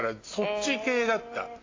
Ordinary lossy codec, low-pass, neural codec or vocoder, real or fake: AAC, 32 kbps; 7.2 kHz; none; real